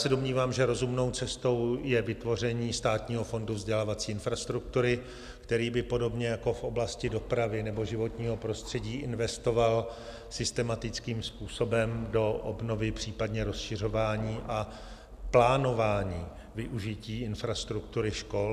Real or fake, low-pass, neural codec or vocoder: real; 14.4 kHz; none